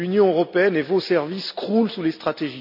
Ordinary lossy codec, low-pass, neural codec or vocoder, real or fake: none; 5.4 kHz; none; real